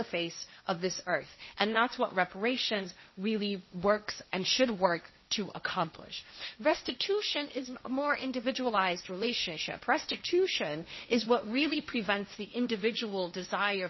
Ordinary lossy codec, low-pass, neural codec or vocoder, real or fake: MP3, 24 kbps; 7.2 kHz; codec, 16 kHz, 1.1 kbps, Voila-Tokenizer; fake